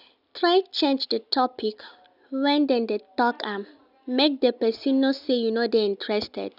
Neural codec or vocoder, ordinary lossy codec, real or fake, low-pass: none; none; real; 5.4 kHz